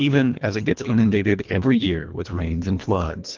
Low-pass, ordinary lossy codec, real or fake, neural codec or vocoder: 7.2 kHz; Opus, 32 kbps; fake; codec, 24 kHz, 1.5 kbps, HILCodec